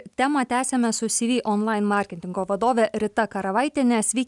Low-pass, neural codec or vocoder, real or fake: 10.8 kHz; none; real